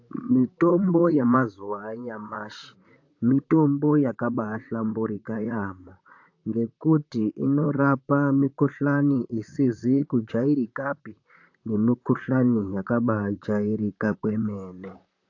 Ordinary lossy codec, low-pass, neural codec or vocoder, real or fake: AAC, 48 kbps; 7.2 kHz; vocoder, 44.1 kHz, 128 mel bands, Pupu-Vocoder; fake